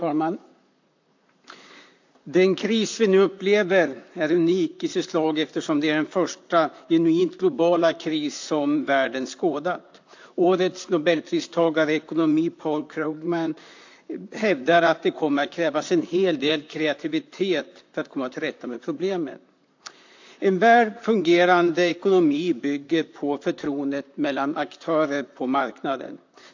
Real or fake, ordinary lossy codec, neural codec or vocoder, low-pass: fake; AAC, 48 kbps; vocoder, 44.1 kHz, 128 mel bands, Pupu-Vocoder; 7.2 kHz